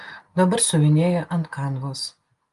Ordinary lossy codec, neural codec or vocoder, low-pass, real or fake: Opus, 32 kbps; none; 10.8 kHz; real